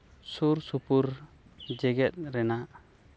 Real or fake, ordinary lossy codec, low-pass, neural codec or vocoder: real; none; none; none